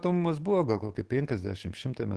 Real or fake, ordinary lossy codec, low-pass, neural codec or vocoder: fake; Opus, 16 kbps; 10.8 kHz; codec, 44.1 kHz, 7.8 kbps, DAC